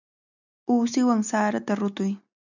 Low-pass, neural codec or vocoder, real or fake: 7.2 kHz; none; real